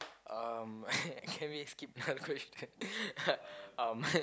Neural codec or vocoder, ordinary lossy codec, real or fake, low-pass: none; none; real; none